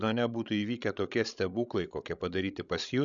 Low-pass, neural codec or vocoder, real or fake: 7.2 kHz; codec, 16 kHz, 16 kbps, FreqCodec, larger model; fake